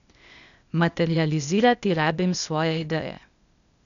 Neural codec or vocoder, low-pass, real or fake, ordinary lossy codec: codec, 16 kHz, 0.8 kbps, ZipCodec; 7.2 kHz; fake; none